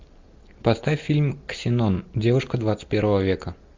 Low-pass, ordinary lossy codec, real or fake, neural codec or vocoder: 7.2 kHz; MP3, 64 kbps; real; none